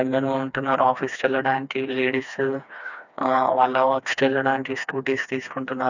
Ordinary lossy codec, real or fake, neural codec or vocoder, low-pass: none; fake; codec, 16 kHz, 2 kbps, FreqCodec, smaller model; 7.2 kHz